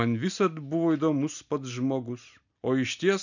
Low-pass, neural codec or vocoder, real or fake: 7.2 kHz; none; real